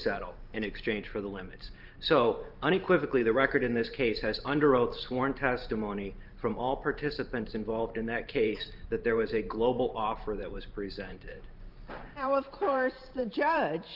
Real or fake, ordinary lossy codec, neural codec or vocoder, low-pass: fake; Opus, 24 kbps; vocoder, 44.1 kHz, 128 mel bands every 512 samples, BigVGAN v2; 5.4 kHz